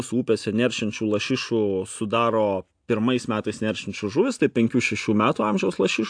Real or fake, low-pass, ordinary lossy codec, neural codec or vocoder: real; 9.9 kHz; AAC, 64 kbps; none